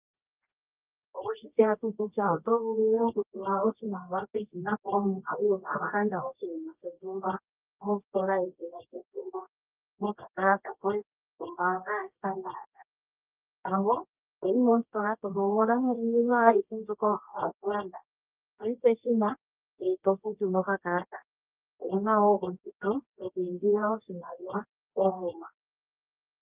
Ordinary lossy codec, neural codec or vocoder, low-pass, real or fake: Opus, 32 kbps; codec, 24 kHz, 0.9 kbps, WavTokenizer, medium music audio release; 3.6 kHz; fake